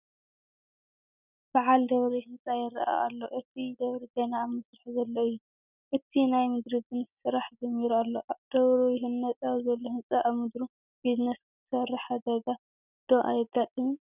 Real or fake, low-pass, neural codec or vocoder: real; 3.6 kHz; none